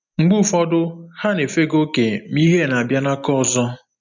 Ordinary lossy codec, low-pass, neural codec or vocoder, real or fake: none; 7.2 kHz; none; real